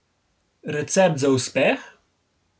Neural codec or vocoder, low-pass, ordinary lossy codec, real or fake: none; none; none; real